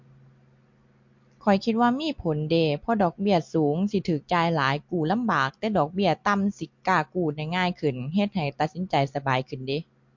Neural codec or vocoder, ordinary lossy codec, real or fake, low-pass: none; MP3, 48 kbps; real; 7.2 kHz